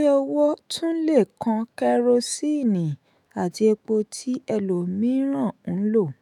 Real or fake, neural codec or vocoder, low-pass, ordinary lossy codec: fake; autoencoder, 48 kHz, 128 numbers a frame, DAC-VAE, trained on Japanese speech; 19.8 kHz; none